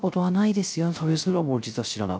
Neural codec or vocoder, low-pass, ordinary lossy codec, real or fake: codec, 16 kHz, 0.3 kbps, FocalCodec; none; none; fake